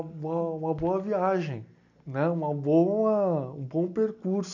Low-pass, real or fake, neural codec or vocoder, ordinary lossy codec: 7.2 kHz; real; none; none